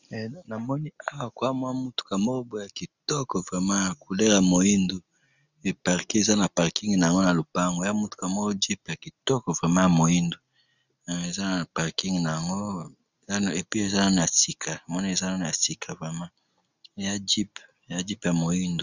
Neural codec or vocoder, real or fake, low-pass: none; real; 7.2 kHz